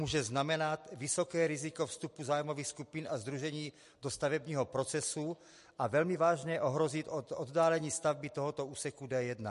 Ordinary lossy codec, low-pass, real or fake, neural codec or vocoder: MP3, 48 kbps; 14.4 kHz; real; none